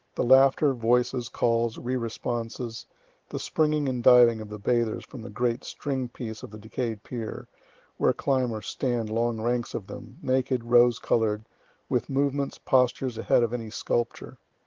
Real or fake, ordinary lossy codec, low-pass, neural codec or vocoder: real; Opus, 16 kbps; 7.2 kHz; none